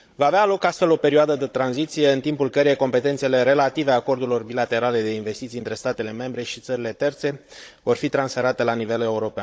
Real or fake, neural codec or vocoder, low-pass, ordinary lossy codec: fake; codec, 16 kHz, 16 kbps, FunCodec, trained on Chinese and English, 50 frames a second; none; none